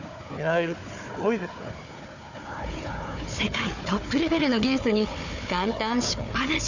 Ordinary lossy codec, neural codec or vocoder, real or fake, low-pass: none; codec, 16 kHz, 4 kbps, FunCodec, trained on Chinese and English, 50 frames a second; fake; 7.2 kHz